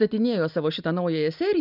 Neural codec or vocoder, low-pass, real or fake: none; 5.4 kHz; real